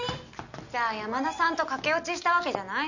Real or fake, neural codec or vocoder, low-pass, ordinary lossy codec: real; none; 7.2 kHz; none